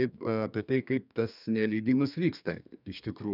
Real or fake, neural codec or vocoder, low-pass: fake; codec, 32 kHz, 1.9 kbps, SNAC; 5.4 kHz